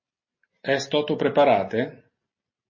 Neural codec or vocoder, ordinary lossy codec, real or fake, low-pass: none; MP3, 32 kbps; real; 7.2 kHz